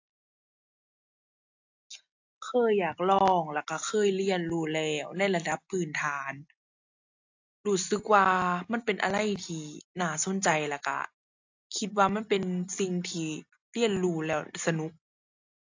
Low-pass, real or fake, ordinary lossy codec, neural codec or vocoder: 7.2 kHz; real; AAC, 48 kbps; none